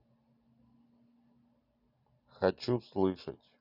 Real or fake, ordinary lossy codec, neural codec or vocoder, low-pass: real; none; none; 5.4 kHz